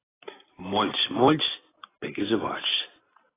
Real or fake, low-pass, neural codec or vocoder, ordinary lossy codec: real; 3.6 kHz; none; AAC, 16 kbps